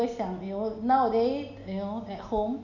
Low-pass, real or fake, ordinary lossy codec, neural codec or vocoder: 7.2 kHz; real; none; none